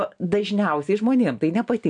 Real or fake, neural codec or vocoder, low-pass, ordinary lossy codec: real; none; 9.9 kHz; AAC, 64 kbps